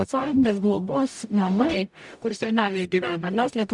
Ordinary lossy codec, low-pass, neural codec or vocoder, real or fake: MP3, 96 kbps; 10.8 kHz; codec, 44.1 kHz, 0.9 kbps, DAC; fake